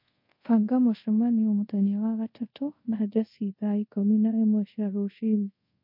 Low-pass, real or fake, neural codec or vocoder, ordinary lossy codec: 5.4 kHz; fake; codec, 24 kHz, 0.5 kbps, DualCodec; MP3, 32 kbps